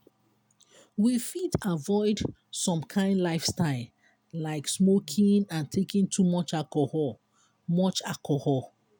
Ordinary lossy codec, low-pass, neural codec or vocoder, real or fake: none; none; none; real